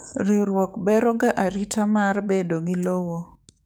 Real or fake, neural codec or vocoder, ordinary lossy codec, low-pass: fake; codec, 44.1 kHz, 7.8 kbps, DAC; none; none